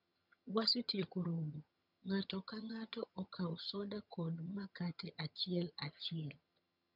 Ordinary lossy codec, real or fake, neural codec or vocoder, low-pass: none; fake; vocoder, 22.05 kHz, 80 mel bands, HiFi-GAN; 5.4 kHz